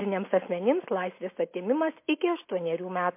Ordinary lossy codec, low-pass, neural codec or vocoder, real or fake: MP3, 24 kbps; 3.6 kHz; none; real